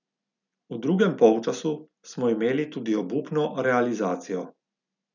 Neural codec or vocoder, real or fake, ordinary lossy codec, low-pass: none; real; none; 7.2 kHz